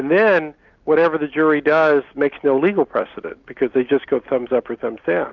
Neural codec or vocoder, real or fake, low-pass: none; real; 7.2 kHz